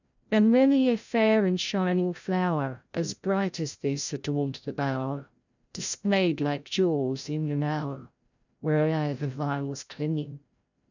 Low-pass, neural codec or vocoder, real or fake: 7.2 kHz; codec, 16 kHz, 0.5 kbps, FreqCodec, larger model; fake